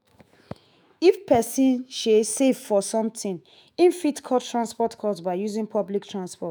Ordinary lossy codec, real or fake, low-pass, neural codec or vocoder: none; fake; none; autoencoder, 48 kHz, 128 numbers a frame, DAC-VAE, trained on Japanese speech